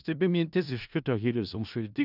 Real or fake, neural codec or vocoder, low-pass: fake; codec, 16 kHz in and 24 kHz out, 0.4 kbps, LongCat-Audio-Codec, four codebook decoder; 5.4 kHz